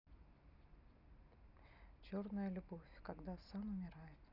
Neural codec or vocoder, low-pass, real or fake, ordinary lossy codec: none; 5.4 kHz; real; none